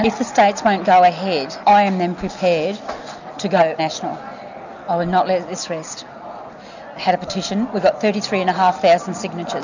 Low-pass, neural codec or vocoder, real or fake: 7.2 kHz; none; real